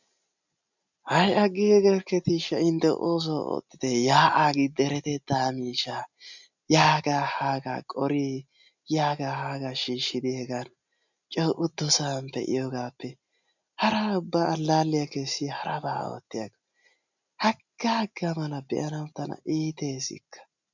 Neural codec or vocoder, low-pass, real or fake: none; 7.2 kHz; real